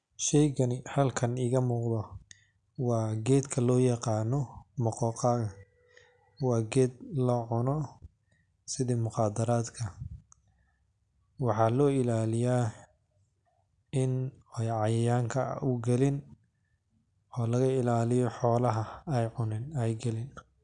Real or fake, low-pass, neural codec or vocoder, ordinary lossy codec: real; 9.9 kHz; none; MP3, 96 kbps